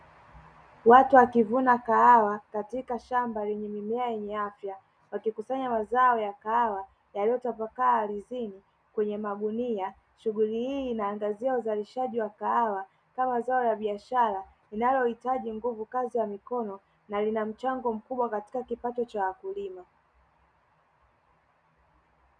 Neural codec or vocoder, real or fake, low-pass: none; real; 9.9 kHz